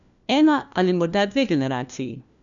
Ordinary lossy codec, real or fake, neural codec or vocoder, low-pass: none; fake; codec, 16 kHz, 1 kbps, FunCodec, trained on LibriTTS, 50 frames a second; 7.2 kHz